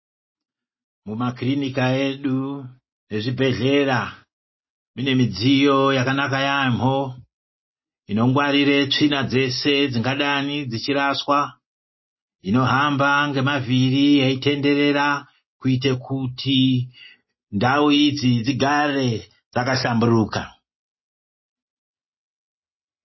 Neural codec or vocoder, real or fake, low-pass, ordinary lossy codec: none; real; 7.2 kHz; MP3, 24 kbps